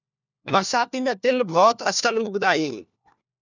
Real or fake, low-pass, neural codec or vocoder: fake; 7.2 kHz; codec, 16 kHz, 1 kbps, FunCodec, trained on LibriTTS, 50 frames a second